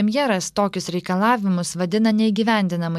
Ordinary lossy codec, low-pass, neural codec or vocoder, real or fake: MP3, 96 kbps; 14.4 kHz; none; real